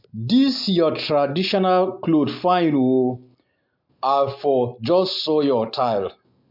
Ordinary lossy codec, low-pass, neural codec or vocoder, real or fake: MP3, 48 kbps; 5.4 kHz; none; real